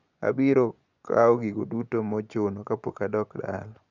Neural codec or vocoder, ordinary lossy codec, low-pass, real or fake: vocoder, 24 kHz, 100 mel bands, Vocos; none; 7.2 kHz; fake